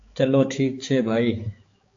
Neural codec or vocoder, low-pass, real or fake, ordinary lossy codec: codec, 16 kHz, 4 kbps, X-Codec, HuBERT features, trained on balanced general audio; 7.2 kHz; fake; AAC, 48 kbps